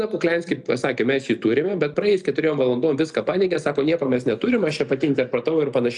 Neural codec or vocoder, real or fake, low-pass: none; real; 10.8 kHz